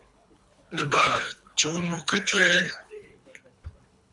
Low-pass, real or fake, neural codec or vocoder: 10.8 kHz; fake; codec, 24 kHz, 3 kbps, HILCodec